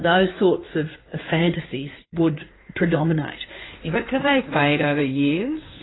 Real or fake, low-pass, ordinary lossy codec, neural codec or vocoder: fake; 7.2 kHz; AAC, 16 kbps; codec, 16 kHz in and 24 kHz out, 2.2 kbps, FireRedTTS-2 codec